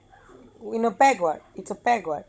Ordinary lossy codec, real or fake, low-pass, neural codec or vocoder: none; fake; none; codec, 16 kHz, 16 kbps, FunCodec, trained on Chinese and English, 50 frames a second